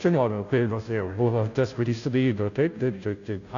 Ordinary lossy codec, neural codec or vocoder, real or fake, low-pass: AAC, 48 kbps; codec, 16 kHz, 0.5 kbps, FunCodec, trained on Chinese and English, 25 frames a second; fake; 7.2 kHz